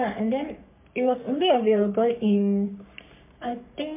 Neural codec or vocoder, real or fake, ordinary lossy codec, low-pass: codec, 44.1 kHz, 3.4 kbps, Pupu-Codec; fake; MP3, 32 kbps; 3.6 kHz